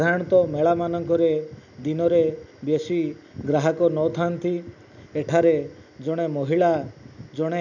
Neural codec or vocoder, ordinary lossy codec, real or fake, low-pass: none; none; real; 7.2 kHz